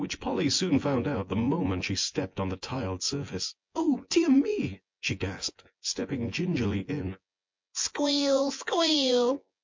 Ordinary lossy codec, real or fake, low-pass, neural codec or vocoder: MP3, 48 kbps; fake; 7.2 kHz; vocoder, 24 kHz, 100 mel bands, Vocos